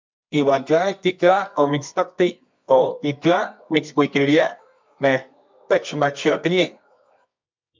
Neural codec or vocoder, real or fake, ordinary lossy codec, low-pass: codec, 24 kHz, 0.9 kbps, WavTokenizer, medium music audio release; fake; MP3, 64 kbps; 7.2 kHz